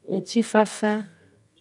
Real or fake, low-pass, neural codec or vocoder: fake; 10.8 kHz; codec, 24 kHz, 0.9 kbps, WavTokenizer, medium music audio release